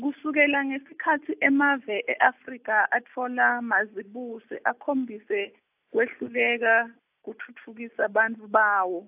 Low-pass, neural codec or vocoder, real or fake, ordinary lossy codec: 3.6 kHz; none; real; AAC, 32 kbps